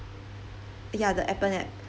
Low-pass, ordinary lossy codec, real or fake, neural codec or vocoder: none; none; real; none